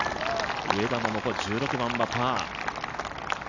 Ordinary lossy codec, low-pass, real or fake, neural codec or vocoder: none; 7.2 kHz; real; none